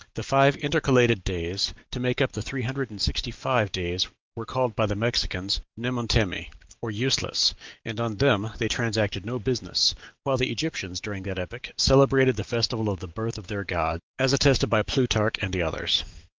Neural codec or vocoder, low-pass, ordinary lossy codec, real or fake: none; 7.2 kHz; Opus, 32 kbps; real